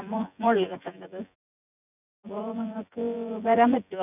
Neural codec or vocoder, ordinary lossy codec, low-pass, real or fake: vocoder, 24 kHz, 100 mel bands, Vocos; none; 3.6 kHz; fake